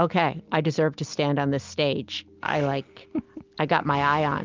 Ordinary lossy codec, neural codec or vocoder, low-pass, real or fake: Opus, 24 kbps; none; 7.2 kHz; real